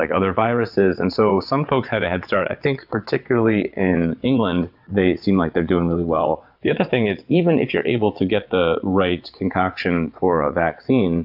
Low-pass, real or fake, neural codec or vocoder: 5.4 kHz; fake; vocoder, 22.05 kHz, 80 mel bands, WaveNeXt